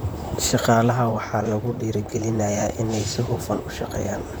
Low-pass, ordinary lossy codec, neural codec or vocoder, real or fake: none; none; vocoder, 44.1 kHz, 128 mel bands, Pupu-Vocoder; fake